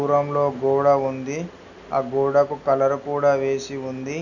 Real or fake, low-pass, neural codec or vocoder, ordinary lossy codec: real; 7.2 kHz; none; none